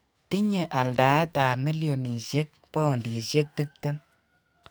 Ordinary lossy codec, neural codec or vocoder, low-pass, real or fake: none; codec, 44.1 kHz, 2.6 kbps, SNAC; none; fake